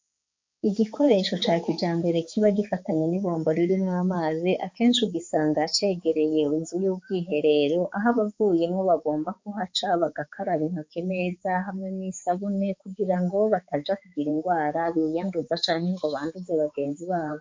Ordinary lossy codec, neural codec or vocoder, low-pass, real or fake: MP3, 48 kbps; codec, 16 kHz, 4 kbps, X-Codec, HuBERT features, trained on balanced general audio; 7.2 kHz; fake